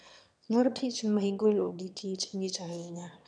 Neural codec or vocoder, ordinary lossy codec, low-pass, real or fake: autoencoder, 22.05 kHz, a latent of 192 numbers a frame, VITS, trained on one speaker; none; 9.9 kHz; fake